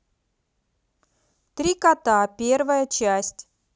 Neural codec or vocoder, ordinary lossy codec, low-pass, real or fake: none; none; none; real